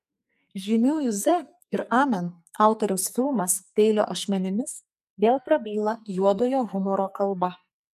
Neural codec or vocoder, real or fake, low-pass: codec, 44.1 kHz, 2.6 kbps, SNAC; fake; 14.4 kHz